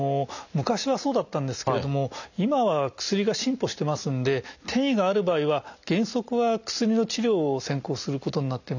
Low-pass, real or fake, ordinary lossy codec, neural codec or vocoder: 7.2 kHz; real; none; none